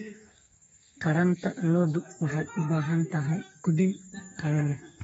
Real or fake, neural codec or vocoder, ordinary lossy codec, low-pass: fake; codec, 32 kHz, 1.9 kbps, SNAC; AAC, 24 kbps; 14.4 kHz